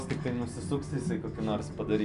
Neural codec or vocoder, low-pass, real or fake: none; 10.8 kHz; real